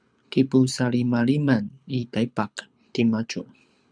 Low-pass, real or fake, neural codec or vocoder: 9.9 kHz; fake; codec, 24 kHz, 6 kbps, HILCodec